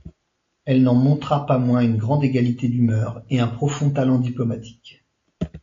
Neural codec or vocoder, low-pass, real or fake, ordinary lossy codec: none; 7.2 kHz; real; AAC, 48 kbps